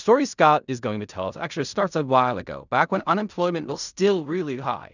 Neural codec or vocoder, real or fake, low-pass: codec, 16 kHz in and 24 kHz out, 0.4 kbps, LongCat-Audio-Codec, fine tuned four codebook decoder; fake; 7.2 kHz